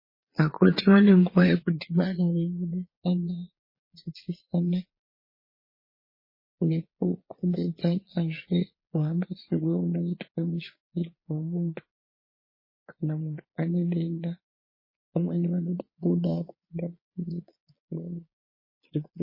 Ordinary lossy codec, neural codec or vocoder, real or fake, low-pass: MP3, 24 kbps; vocoder, 22.05 kHz, 80 mel bands, Vocos; fake; 5.4 kHz